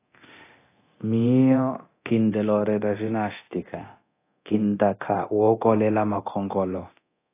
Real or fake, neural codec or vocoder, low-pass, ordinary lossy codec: fake; codec, 24 kHz, 0.9 kbps, DualCodec; 3.6 kHz; AAC, 24 kbps